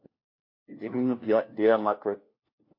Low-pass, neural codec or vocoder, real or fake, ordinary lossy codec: 5.4 kHz; codec, 16 kHz, 1 kbps, FunCodec, trained on LibriTTS, 50 frames a second; fake; MP3, 32 kbps